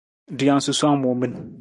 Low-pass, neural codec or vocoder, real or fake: 10.8 kHz; none; real